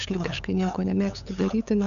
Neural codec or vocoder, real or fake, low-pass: codec, 16 kHz, 8 kbps, FunCodec, trained on LibriTTS, 25 frames a second; fake; 7.2 kHz